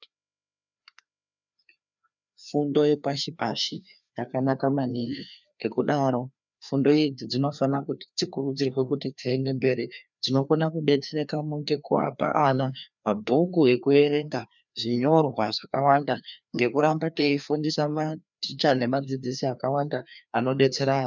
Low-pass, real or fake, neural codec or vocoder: 7.2 kHz; fake; codec, 16 kHz, 2 kbps, FreqCodec, larger model